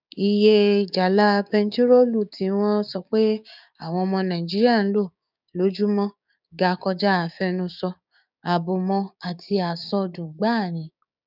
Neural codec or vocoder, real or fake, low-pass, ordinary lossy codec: codec, 16 kHz, 6 kbps, DAC; fake; 5.4 kHz; none